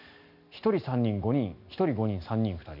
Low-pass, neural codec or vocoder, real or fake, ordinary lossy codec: 5.4 kHz; none; real; none